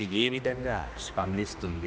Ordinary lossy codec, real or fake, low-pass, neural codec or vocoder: none; fake; none; codec, 16 kHz, 1 kbps, X-Codec, HuBERT features, trained on general audio